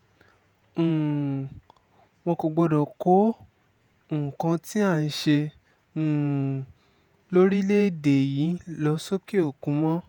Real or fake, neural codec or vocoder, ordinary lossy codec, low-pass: fake; vocoder, 48 kHz, 128 mel bands, Vocos; none; 19.8 kHz